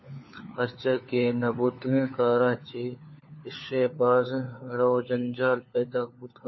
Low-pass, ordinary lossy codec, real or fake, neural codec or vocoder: 7.2 kHz; MP3, 24 kbps; fake; codec, 16 kHz, 4 kbps, FunCodec, trained on LibriTTS, 50 frames a second